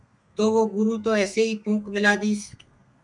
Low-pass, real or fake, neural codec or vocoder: 10.8 kHz; fake; codec, 32 kHz, 1.9 kbps, SNAC